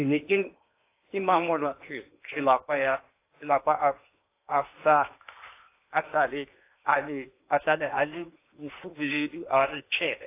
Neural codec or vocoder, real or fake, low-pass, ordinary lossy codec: codec, 16 kHz in and 24 kHz out, 0.8 kbps, FocalCodec, streaming, 65536 codes; fake; 3.6 kHz; AAC, 24 kbps